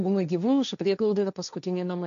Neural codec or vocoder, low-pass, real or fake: codec, 16 kHz, 1.1 kbps, Voila-Tokenizer; 7.2 kHz; fake